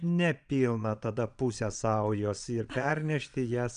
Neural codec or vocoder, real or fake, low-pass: vocoder, 22.05 kHz, 80 mel bands, Vocos; fake; 9.9 kHz